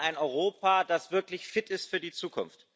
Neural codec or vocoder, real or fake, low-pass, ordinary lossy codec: none; real; none; none